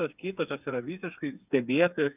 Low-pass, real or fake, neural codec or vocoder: 3.6 kHz; fake; codec, 16 kHz, 4 kbps, FreqCodec, smaller model